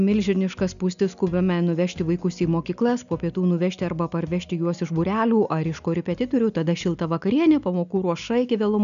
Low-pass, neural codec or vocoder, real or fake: 7.2 kHz; none; real